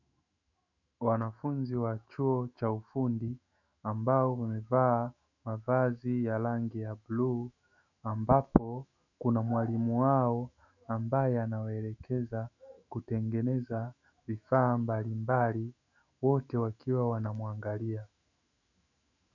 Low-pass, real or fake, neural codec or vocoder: 7.2 kHz; fake; autoencoder, 48 kHz, 128 numbers a frame, DAC-VAE, trained on Japanese speech